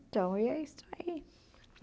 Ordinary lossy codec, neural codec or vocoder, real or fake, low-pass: none; none; real; none